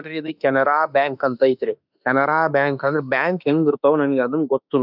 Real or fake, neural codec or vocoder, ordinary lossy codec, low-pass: fake; autoencoder, 48 kHz, 32 numbers a frame, DAC-VAE, trained on Japanese speech; none; 5.4 kHz